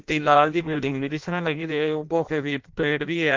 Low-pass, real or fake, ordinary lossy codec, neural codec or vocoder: 7.2 kHz; fake; Opus, 24 kbps; codec, 16 kHz in and 24 kHz out, 0.6 kbps, FireRedTTS-2 codec